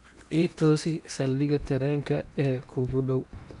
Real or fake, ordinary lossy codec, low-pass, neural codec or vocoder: fake; none; 10.8 kHz; codec, 16 kHz in and 24 kHz out, 0.8 kbps, FocalCodec, streaming, 65536 codes